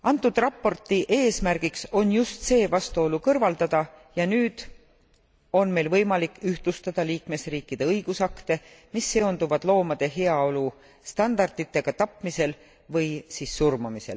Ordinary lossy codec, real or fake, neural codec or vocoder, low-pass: none; real; none; none